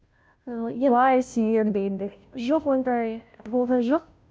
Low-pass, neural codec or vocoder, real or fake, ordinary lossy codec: none; codec, 16 kHz, 0.5 kbps, FunCodec, trained on Chinese and English, 25 frames a second; fake; none